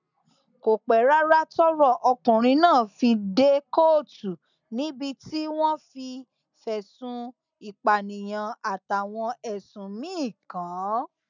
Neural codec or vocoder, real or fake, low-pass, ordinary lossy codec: none; real; 7.2 kHz; none